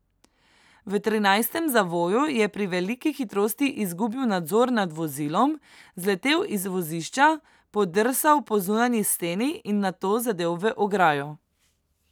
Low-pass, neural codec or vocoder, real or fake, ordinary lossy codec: none; none; real; none